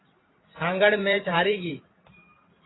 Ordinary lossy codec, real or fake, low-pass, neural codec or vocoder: AAC, 16 kbps; fake; 7.2 kHz; vocoder, 44.1 kHz, 128 mel bands every 512 samples, BigVGAN v2